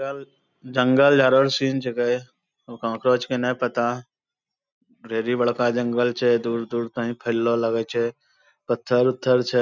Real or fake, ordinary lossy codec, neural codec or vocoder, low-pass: real; none; none; 7.2 kHz